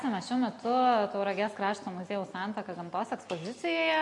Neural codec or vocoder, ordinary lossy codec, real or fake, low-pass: none; MP3, 48 kbps; real; 10.8 kHz